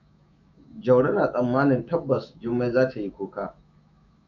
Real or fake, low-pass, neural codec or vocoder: fake; 7.2 kHz; autoencoder, 48 kHz, 128 numbers a frame, DAC-VAE, trained on Japanese speech